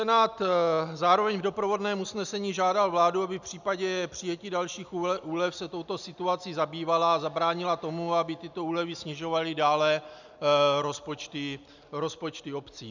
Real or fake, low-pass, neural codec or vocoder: real; 7.2 kHz; none